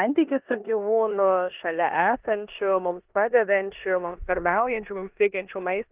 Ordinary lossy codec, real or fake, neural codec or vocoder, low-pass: Opus, 32 kbps; fake; codec, 16 kHz in and 24 kHz out, 0.9 kbps, LongCat-Audio-Codec, four codebook decoder; 3.6 kHz